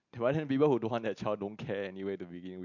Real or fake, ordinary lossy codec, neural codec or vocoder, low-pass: real; MP3, 64 kbps; none; 7.2 kHz